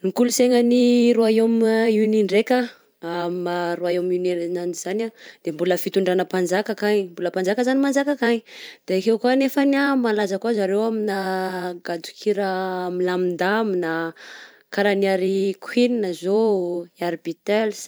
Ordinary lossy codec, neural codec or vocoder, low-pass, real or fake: none; vocoder, 44.1 kHz, 128 mel bands every 512 samples, BigVGAN v2; none; fake